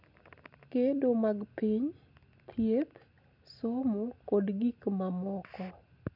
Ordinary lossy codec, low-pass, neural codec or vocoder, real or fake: none; 5.4 kHz; none; real